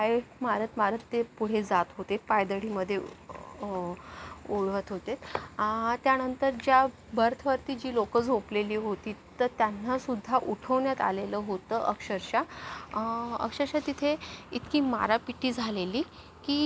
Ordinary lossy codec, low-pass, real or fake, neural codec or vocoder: none; none; real; none